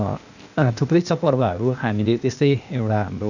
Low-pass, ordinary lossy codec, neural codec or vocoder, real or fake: 7.2 kHz; none; codec, 16 kHz, 0.8 kbps, ZipCodec; fake